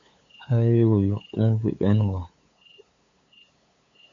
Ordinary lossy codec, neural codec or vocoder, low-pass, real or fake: MP3, 64 kbps; codec, 16 kHz, 8 kbps, FunCodec, trained on LibriTTS, 25 frames a second; 7.2 kHz; fake